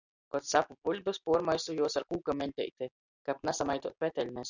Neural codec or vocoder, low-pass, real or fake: none; 7.2 kHz; real